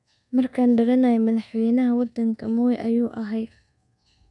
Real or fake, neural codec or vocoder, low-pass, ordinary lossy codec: fake; codec, 24 kHz, 1.2 kbps, DualCodec; 10.8 kHz; none